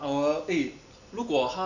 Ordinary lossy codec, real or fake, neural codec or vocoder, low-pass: none; real; none; 7.2 kHz